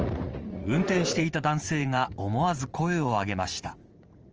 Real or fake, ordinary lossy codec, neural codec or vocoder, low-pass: fake; Opus, 24 kbps; vocoder, 44.1 kHz, 80 mel bands, Vocos; 7.2 kHz